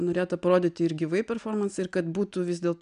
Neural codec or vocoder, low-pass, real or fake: none; 9.9 kHz; real